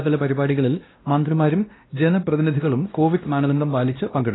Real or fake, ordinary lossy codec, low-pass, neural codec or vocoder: fake; AAC, 16 kbps; 7.2 kHz; codec, 16 kHz, 1 kbps, X-Codec, WavLM features, trained on Multilingual LibriSpeech